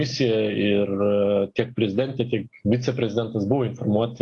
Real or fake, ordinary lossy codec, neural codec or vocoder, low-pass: real; AAC, 48 kbps; none; 10.8 kHz